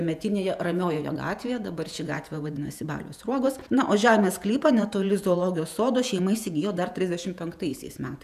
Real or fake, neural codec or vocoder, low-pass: fake; vocoder, 48 kHz, 128 mel bands, Vocos; 14.4 kHz